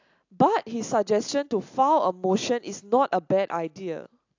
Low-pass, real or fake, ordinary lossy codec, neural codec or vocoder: 7.2 kHz; real; AAC, 48 kbps; none